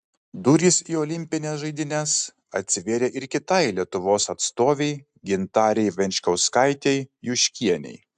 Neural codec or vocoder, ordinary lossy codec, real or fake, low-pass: none; AAC, 96 kbps; real; 9.9 kHz